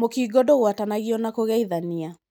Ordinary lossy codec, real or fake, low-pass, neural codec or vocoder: none; real; none; none